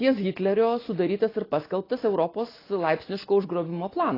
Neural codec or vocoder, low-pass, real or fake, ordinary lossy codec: none; 5.4 kHz; real; AAC, 24 kbps